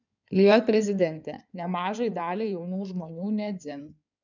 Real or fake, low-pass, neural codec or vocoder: fake; 7.2 kHz; codec, 16 kHz in and 24 kHz out, 2.2 kbps, FireRedTTS-2 codec